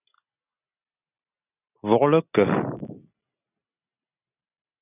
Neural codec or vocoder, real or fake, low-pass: none; real; 3.6 kHz